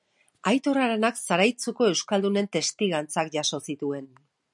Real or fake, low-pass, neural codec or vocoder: real; 10.8 kHz; none